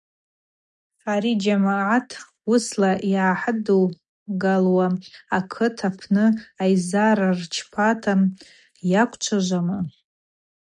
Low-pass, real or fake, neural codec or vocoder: 10.8 kHz; real; none